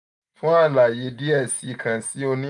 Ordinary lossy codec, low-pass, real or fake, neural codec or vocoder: none; 10.8 kHz; real; none